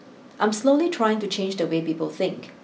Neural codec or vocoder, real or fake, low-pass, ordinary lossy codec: none; real; none; none